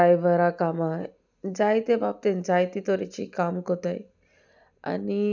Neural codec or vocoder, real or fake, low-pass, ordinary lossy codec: none; real; 7.2 kHz; none